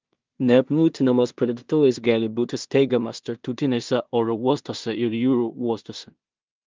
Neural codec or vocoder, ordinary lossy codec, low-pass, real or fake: codec, 16 kHz in and 24 kHz out, 0.4 kbps, LongCat-Audio-Codec, two codebook decoder; Opus, 24 kbps; 7.2 kHz; fake